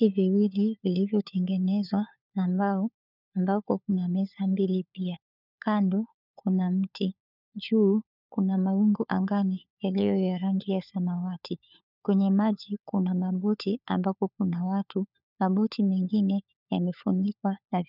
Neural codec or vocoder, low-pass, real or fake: codec, 16 kHz, 4 kbps, FunCodec, trained on LibriTTS, 50 frames a second; 5.4 kHz; fake